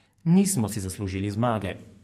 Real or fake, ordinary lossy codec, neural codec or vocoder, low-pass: fake; MP3, 64 kbps; codec, 44.1 kHz, 2.6 kbps, SNAC; 14.4 kHz